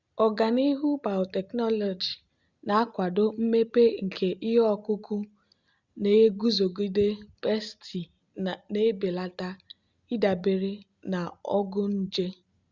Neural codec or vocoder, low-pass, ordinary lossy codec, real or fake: none; 7.2 kHz; Opus, 64 kbps; real